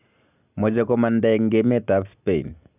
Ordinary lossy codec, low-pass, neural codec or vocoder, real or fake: none; 3.6 kHz; none; real